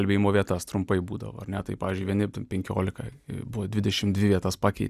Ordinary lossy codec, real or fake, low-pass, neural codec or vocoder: Opus, 64 kbps; fake; 14.4 kHz; vocoder, 44.1 kHz, 128 mel bands every 256 samples, BigVGAN v2